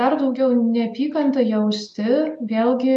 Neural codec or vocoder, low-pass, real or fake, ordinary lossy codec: none; 10.8 kHz; real; MP3, 96 kbps